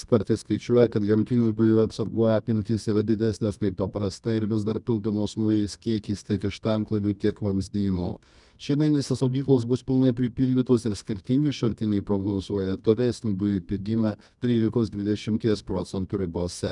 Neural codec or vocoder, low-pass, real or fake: codec, 24 kHz, 0.9 kbps, WavTokenizer, medium music audio release; 10.8 kHz; fake